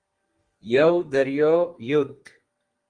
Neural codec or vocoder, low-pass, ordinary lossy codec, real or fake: codec, 32 kHz, 1.9 kbps, SNAC; 9.9 kHz; Opus, 32 kbps; fake